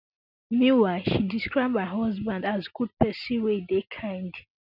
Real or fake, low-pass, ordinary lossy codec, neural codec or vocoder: real; 5.4 kHz; none; none